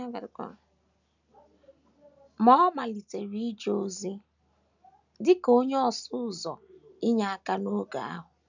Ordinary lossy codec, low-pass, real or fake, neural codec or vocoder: none; 7.2 kHz; real; none